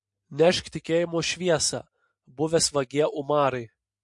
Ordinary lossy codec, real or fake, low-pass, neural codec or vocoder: MP3, 48 kbps; real; 10.8 kHz; none